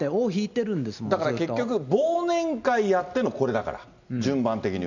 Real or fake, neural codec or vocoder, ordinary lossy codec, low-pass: real; none; none; 7.2 kHz